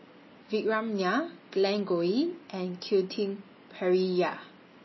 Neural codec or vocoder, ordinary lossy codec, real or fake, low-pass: none; MP3, 24 kbps; real; 7.2 kHz